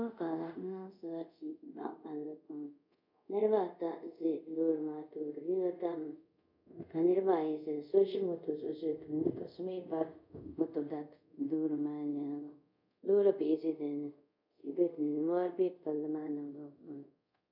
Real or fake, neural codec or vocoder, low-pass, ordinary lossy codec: fake; codec, 24 kHz, 0.5 kbps, DualCodec; 5.4 kHz; MP3, 48 kbps